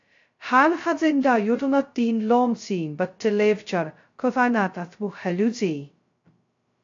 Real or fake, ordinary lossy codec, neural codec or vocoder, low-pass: fake; AAC, 48 kbps; codec, 16 kHz, 0.2 kbps, FocalCodec; 7.2 kHz